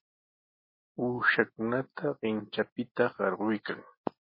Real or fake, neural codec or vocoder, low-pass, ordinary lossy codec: real; none; 5.4 kHz; MP3, 24 kbps